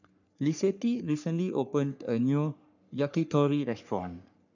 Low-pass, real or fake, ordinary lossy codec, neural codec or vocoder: 7.2 kHz; fake; none; codec, 44.1 kHz, 3.4 kbps, Pupu-Codec